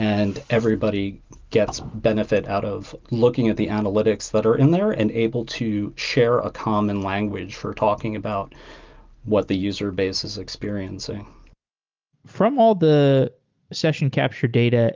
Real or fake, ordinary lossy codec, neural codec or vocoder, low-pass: real; Opus, 32 kbps; none; 7.2 kHz